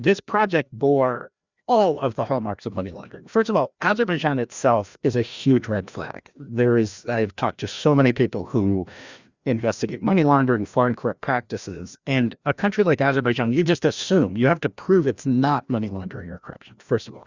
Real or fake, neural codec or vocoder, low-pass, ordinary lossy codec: fake; codec, 16 kHz, 1 kbps, FreqCodec, larger model; 7.2 kHz; Opus, 64 kbps